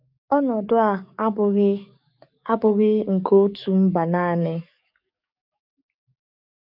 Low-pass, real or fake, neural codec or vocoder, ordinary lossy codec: 5.4 kHz; fake; codec, 44.1 kHz, 7.8 kbps, DAC; none